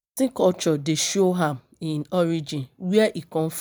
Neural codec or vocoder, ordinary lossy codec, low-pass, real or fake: none; none; none; real